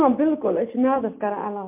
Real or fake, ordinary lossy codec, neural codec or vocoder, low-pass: real; MP3, 32 kbps; none; 3.6 kHz